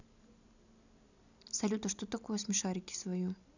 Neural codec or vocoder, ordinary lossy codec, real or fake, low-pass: none; none; real; 7.2 kHz